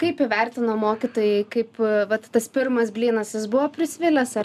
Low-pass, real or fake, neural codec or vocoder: 14.4 kHz; real; none